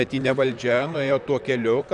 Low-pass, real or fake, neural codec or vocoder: 10.8 kHz; fake; vocoder, 44.1 kHz, 128 mel bands, Pupu-Vocoder